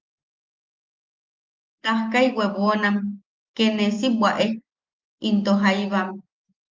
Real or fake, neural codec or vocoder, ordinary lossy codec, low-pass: real; none; Opus, 24 kbps; 7.2 kHz